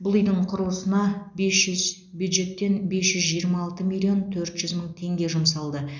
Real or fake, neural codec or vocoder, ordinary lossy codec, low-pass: real; none; none; 7.2 kHz